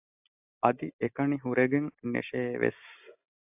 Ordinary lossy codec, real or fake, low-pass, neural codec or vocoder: AAC, 32 kbps; real; 3.6 kHz; none